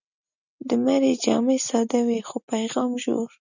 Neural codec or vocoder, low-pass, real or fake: none; 7.2 kHz; real